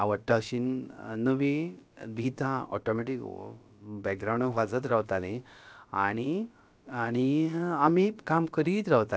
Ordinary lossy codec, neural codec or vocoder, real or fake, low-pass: none; codec, 16 kHz, about 1 kbps, DyCAST, with the encoder's durations; fake; none